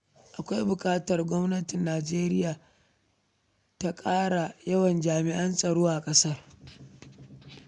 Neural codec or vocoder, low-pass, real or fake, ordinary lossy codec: none; 10.8 kHz; real; none